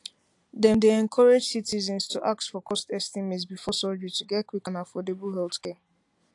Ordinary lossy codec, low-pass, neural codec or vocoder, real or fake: AAC, 64 kbps; 10.8 kHz; none; real